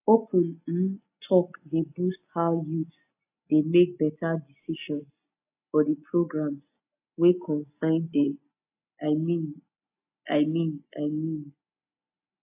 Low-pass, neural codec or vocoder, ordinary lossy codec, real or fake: 3.6 kHz; none; AAC, 32 kbps; real